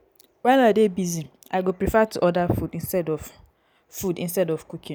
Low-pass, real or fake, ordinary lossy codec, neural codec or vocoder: none; real; none; none